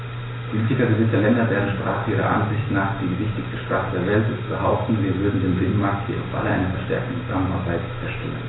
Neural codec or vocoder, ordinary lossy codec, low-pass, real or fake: none; AAC, 16 kbps; 7.2 kHz; real